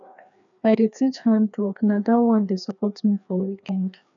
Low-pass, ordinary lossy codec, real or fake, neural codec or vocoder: 7.2 kHz; none; fake; codec, 16 kHz, 2 kbps, FreqCodec, larger model